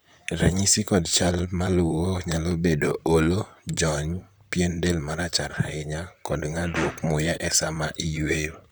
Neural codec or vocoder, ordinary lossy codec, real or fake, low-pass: vocoder, 44.1 kHz, 128 mel bands, Pupu-Vocoder; none; fake; none